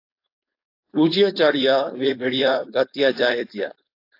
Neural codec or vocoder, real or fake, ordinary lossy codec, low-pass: codec, 16 kHz, 4.8 kbps, FACodec; fake; AAC, 32 kbps; 5.4 kHz